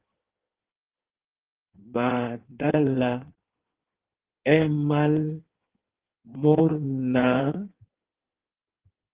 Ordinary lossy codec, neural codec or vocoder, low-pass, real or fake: Opus, 16 kbps; codec, 16 kHz in and 24 kHz out, 1.1 kbps, FireRedTTS-2 codec; 3.6 kHz; fake